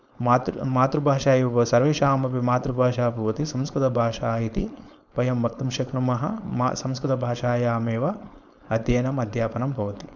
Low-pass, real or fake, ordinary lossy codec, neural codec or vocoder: 7.2 kHz; fake; none; codec, 16 kHz, 4.8 kbps, FACodec